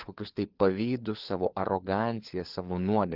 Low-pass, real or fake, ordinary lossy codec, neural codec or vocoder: 5.4 kHz; fake; Opus, 16 kbps; codec, 16 kHz, 4 kbps, FunCodec, trained on LibriTTS, 50 frames a second